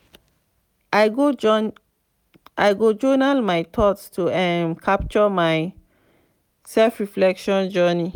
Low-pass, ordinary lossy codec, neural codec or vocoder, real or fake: 19.8 kHz; none; none; real